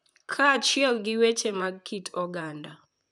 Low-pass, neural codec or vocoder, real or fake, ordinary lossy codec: 10.8 kHz; vocoder, 44.1 kHz, 128 mel bands, Pupu-Vocoder; fake; none